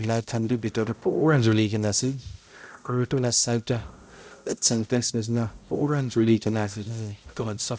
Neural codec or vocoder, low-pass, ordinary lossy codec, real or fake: codec, 16 kHz, 0.5 kbps, X-Codec, HuBERT features, trained on balanced general audio; none; none; fake